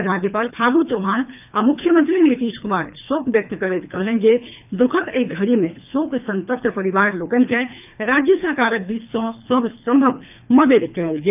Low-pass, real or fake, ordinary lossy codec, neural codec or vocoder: 3.6 kHz; fake; none; codec, 24 kHz, 3 kbps, HILCodec